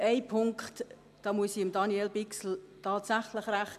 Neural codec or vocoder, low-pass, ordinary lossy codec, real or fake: none; 14.4 kHz; MP3, 96 kbps; real